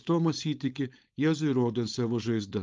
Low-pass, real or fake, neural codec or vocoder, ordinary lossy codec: 7.2 kHz; fake; codec, 16 kHz, 4.8 kbps, FACodec; Opus, 32 kbps